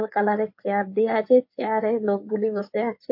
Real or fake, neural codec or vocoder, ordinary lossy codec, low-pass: fake; codec, 16 kHz in and 24 kHz out, 2.2 kbps, FireRedTTS-2 codec; MP3, 32 kbps; 5.4 kHz